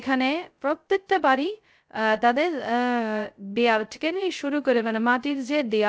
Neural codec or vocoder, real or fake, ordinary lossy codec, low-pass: codec, 16 kHz, 0.2 kbps, FocalCodec; fake; none; none